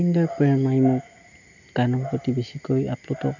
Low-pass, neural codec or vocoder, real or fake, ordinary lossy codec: 7.2 kHz; none; real; none